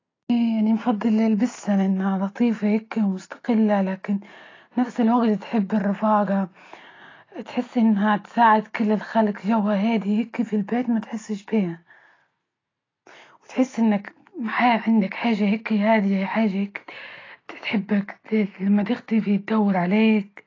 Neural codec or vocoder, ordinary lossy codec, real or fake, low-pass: none; AAC, 32 kbps; real; 7.2 kHz